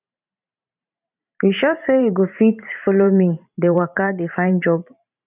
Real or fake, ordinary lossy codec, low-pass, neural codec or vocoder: fake; none; 3.6 kHz; vocoder, 44.1 kHz, 128 mel bands every 512 samples, BigVGAN v2